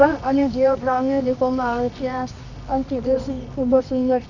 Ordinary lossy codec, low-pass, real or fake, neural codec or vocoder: none; 7.2 kHz; fake; codec, 24 kHz, 0.9 kbps, WavTokenizer, medium music audio release